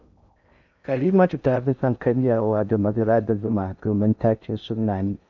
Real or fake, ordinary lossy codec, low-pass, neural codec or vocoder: fake; none; 7.2 kHz; codec, 16 kHz in and 24 kHz out, 0.6 kbps, FocalCodec, streaming, 2048 codes